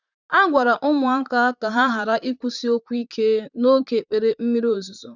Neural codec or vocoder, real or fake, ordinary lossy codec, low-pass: vocoder, 44.1 kHz, 128 mel bands, Pupu-Vocoder; fake; none; 7.2 kHz